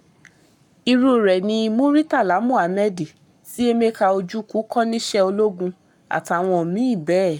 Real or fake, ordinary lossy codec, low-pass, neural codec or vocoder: fake; none; 19.8 kHz; codec, 44.1 kHz, 7.8 kbps, Pupu-Codec